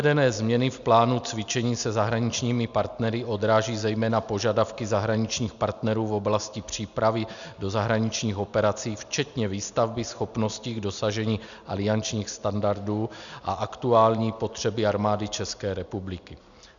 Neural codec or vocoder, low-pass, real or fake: none; 7.2 kHz; real